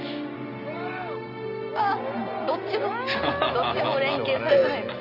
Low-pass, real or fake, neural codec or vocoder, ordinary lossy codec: 5.4 kHz; real; none; none